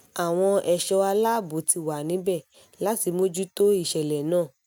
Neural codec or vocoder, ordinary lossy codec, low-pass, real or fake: none; none; none; real